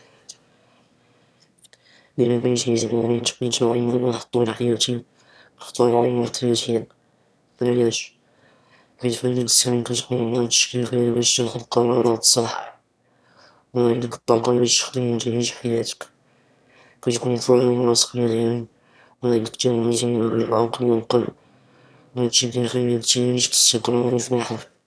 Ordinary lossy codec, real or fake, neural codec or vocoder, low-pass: none; fake; autoencoder, 22.05 kHz, a latent of 192 numbers a frame, VITS, trained on one speaker; none